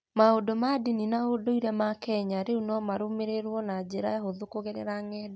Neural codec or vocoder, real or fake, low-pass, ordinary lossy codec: none; real; none; none